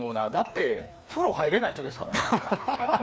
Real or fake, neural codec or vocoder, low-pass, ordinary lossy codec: fake; codec, 16 kHz, 2 kbps, FreqCodec, larger model; none; none